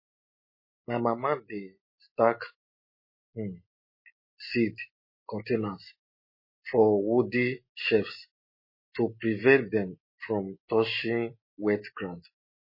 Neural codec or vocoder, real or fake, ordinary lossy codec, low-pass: none; real; MP3, 24 kbps; 5.4 kHz